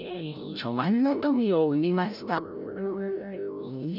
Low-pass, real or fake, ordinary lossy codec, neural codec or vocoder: 5.4 kHz; fake; none; codec, 16 kHz, 0.5 kbps, FreqCodec, larger model